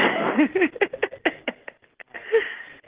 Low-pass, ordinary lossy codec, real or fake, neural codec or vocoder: 3.6 kHz; Opus, 16 kbps; real; none